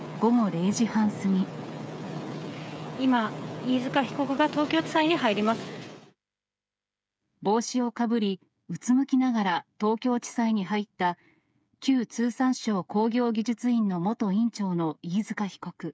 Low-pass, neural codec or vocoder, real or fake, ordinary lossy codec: none; codec, 16 kHz, 8 kbps, FreqCodec, smaller model; fake; none